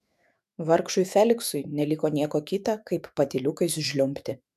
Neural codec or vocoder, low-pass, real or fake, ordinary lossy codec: autoencoder, 48 kHz, 128 numbers a frame, DAC-VAE, trained on Japanese speech; 14.4 kHz; fake; MP3, 96 kbps